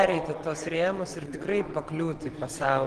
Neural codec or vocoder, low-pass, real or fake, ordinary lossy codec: vocoder, 22.05 kHz, 80 mel bands, WaveNeXt; 9.9 kHz; fake; Opus, 16 kbps